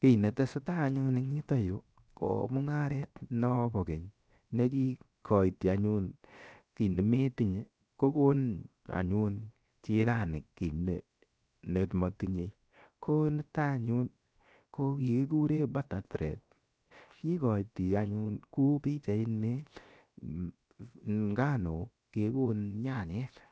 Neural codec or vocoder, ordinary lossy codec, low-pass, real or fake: codec, 16 kHz, 0.7 kbps, FocalCodec; none; none; fake